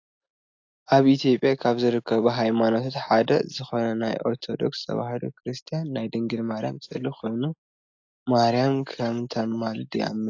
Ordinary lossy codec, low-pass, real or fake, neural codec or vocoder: AAC, 48 kbps; 7.2 kHz; real; none